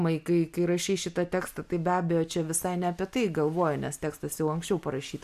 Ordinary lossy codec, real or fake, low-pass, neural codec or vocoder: AAC, 96 kbps; real; 14.4 kHz; none